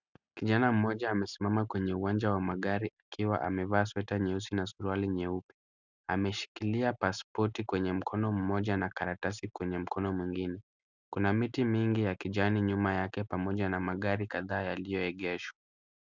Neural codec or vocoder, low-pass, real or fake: none; 7.2 kHz; real